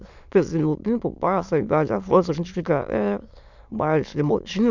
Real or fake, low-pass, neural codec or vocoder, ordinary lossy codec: fake; 7.2 kHz; autoencoder, 22.05 kHz, a latent of 192 numbers a frame, VITS, trained on many speakers; none